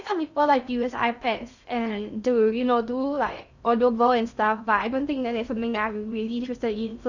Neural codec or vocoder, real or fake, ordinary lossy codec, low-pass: codec, 16 kHz in and 24 kHz out, 0.6 kbps, FocalCodec, streaming, 2048 codes; fake; none; 7.2 kHz